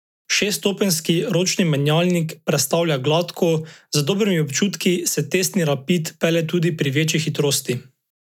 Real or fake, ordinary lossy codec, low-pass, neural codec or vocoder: real; none; 19.8 kHz; none